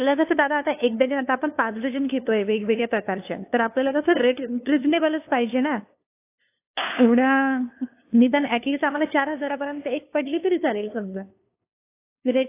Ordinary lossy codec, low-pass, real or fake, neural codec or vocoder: AAC, 24 kbps; 3.6 kHz; fake; codec, 16 kHz, 2 kbps, FunCodec, trained on LibriTTS, 25 frames a second